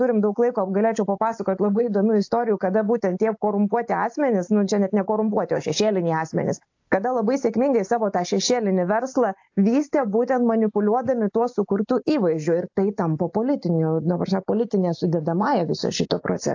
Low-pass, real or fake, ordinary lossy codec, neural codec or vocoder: 7.2 kHz; real; AAC, 48 kbps; none